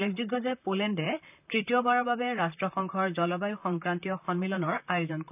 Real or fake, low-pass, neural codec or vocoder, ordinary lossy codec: fake; 3.6 kHz; vocoder, 44.1 kHz, 128 mel bands, Pupu-Vocoder; none